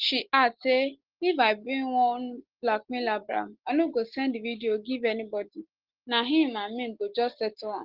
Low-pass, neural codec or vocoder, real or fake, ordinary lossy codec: 5.4 kHz; none; real; Opus, 16 kbps